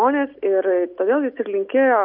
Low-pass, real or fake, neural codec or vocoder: 5.4 kHz; real; none